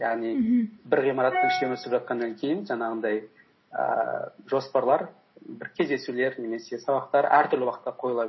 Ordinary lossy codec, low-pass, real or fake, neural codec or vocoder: MP3, 24 kbps; 7.2 kHz; real; none